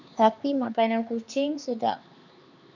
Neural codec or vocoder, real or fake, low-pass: codec, 16 kHz, 4 kbps, X-Codec, HuBERT features, trained on LibriSpeech; fake; 7.2 kHz